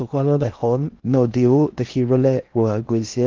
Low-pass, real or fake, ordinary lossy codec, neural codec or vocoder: 7.2 kHz; fake; Opus, 32 kbps; codec, 16 kHz in and 24 kHz out, 0.6 kbps, FocalCodec, streaming, 4096 codes